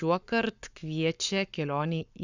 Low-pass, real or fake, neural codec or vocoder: 7.2 kHz; fake; codec, 44.1 kHz, 7.8 kbps, Pupu-Codec